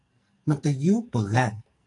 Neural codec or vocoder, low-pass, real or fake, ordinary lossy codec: codec, 44.1 kHz, 2.6 kbps, SNAC; 10.8 kHz; fake; AAC, 48 kbps